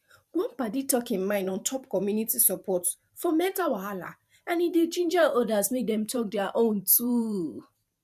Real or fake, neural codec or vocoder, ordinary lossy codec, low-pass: fake; vocoder, 44.1 kHz, 128 mel bands every 512 samples, BigVGAN v2; none; 14.4 kHz